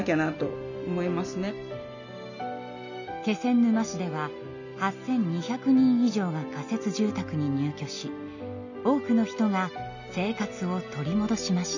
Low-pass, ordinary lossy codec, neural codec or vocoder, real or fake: 7.2 kHz; none; none; real